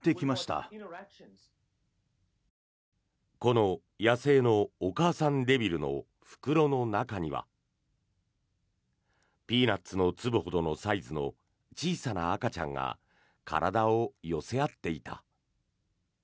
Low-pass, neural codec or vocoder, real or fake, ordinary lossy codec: none; none; real; none